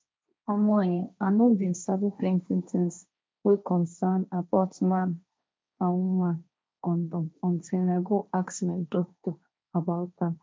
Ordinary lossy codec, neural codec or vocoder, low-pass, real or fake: none; codec, 16 kHz, 1.1 kbps, Voila-Tokenizer; none; fake